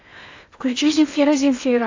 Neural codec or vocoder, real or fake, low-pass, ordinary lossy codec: codec, 16 kHz in and 24 kHz out, 0.8 kbps, FocalCodec, streaming, 65536 codes; fake; 7.2 kHz; AAC, 48 kbps